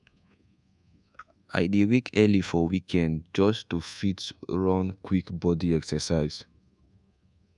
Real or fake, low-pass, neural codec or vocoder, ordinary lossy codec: fake; 10.8 kHz; codec, 24 kHz, 1.2 kbps, DualCodec; none